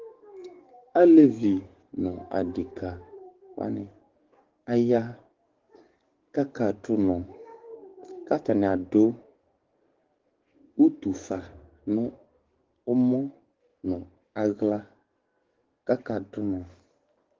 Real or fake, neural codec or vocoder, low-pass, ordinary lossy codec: fake; codec, 44.1 kHz, 7.8 kbps, DAC; 7.2 kHz; Opus, 16 kbps